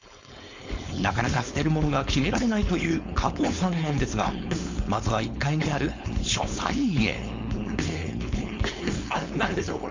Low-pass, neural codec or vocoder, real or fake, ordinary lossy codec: 7.2 kHz; codec, 16 kHz, 4.8 kbps, FACodec; fake; MP3, 64 kbps